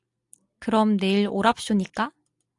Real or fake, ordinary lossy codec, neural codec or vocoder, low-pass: real; AAC, 64 kbps; none; 10.8 kHz